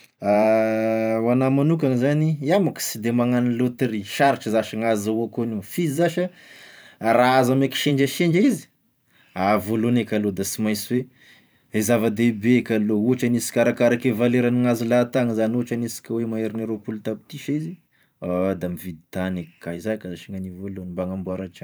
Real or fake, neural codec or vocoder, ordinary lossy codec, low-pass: fake; vocoder, 44.1 kHz, 128 mel bands every 512 samples, BigVGAN v2; none; none